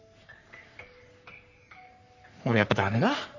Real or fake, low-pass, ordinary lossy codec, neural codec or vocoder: fake; 7.2 kHz; none; codec, 44.1 kHz, 3.4 kbps, Pupu-Codec